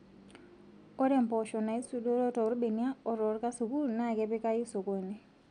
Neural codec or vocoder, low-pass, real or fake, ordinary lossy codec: none; 9.9 kHz; real; none